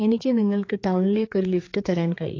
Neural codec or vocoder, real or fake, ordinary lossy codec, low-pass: codec, 16 kHz, 4 kbps, X-Codec, HuBERT features, trained on general audio; fake; AAC, 32 kbps; 7.2 kHz